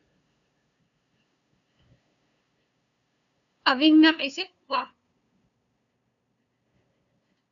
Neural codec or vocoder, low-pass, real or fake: codec, 16 kHz, 2 kbps, FunCodec, trained on LibriTTS, 25 frames a second; 7.2 kHz; fake